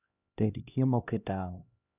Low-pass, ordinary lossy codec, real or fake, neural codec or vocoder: 3.6 kHz; none; fake; codec, 16 kHz, 1 kbps, X-Codec, HuBERT features, trained on LibriSpeech